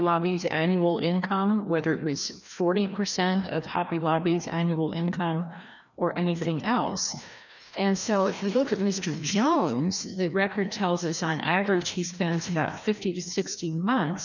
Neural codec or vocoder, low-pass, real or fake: codec, 16 kHz, 1 kbps, FreqCodec, larger model; 7.2 kHz; fake